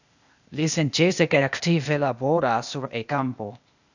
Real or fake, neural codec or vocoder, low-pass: fake; codec, 16 kHz, 0.8 kbps, ZipCodec; 7.2 kHz